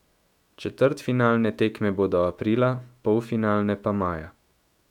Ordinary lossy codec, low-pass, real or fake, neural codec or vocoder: none; 19.8 kHz; fake; autoencoder, 48 kHz, 128 numbers a frame, DAC-VAE, trained on Japanese speech